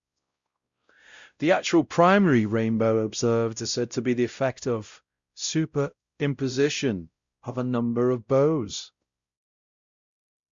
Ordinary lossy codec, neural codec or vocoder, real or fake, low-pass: Opus, 64 kbps; codec, 16 kHz, 0.5 kbps, X-Codec, WavLM features, trained on Multilingual LibriSpeech; fake; 7.2 kHz